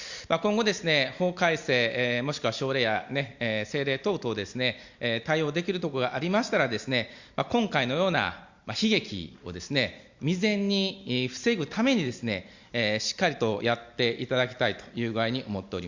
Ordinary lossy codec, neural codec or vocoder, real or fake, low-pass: Opus, 64 kbps; none; real; 7.2 kHz